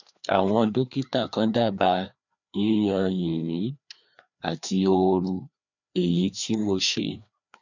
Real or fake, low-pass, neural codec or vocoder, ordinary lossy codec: fake; 7.2 kHz; codec, 16 kHz, 2 kbps, FreqCodec, larger model; none